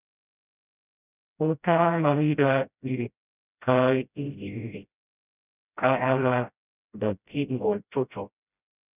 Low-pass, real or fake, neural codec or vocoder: 3.6 kHz; fake; codec, 16 kHz, 0.5 kbps, FreqCodec, smaller model